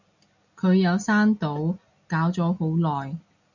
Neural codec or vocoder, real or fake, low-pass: none; real; 7.2 kHz